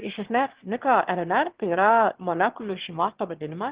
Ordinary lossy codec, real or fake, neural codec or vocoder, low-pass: Opus, 16 kbps; fake; autoencoder, 22.05 kHz, a latent of 192 numbers a frame, VITS, trained on one speaker; 3.6 kHz